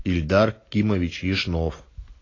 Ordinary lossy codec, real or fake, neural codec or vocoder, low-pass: AAC, 32 kbps; real; none; 7.2 kHz